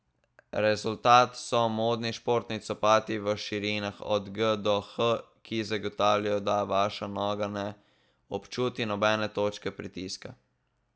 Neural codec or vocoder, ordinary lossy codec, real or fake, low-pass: none; none; real; none